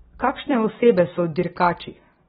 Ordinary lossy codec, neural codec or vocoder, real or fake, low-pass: AAC, 16 kbps; none; real; 19.8 kHz